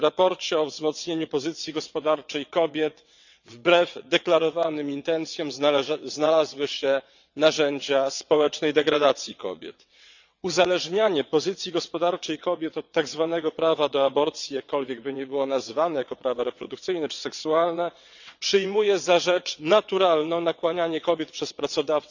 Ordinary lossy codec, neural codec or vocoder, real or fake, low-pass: none; vocoder, 22.05 kHz, 80 mel bands, WaveNeXt; fake; 7.2 kHz